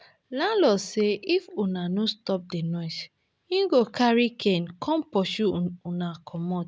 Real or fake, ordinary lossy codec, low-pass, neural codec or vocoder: real; none; none; none